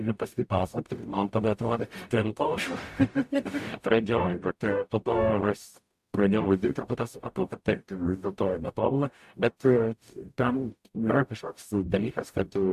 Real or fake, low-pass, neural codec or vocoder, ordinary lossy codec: fake; 14.4 kHz; codec, 44.1 kHz, 0.9 kbps, DAC; AAC, 96 kbps